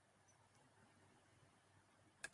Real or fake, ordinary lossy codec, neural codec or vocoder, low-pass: real; AAC, 64 kbps; none; 10.8 kHz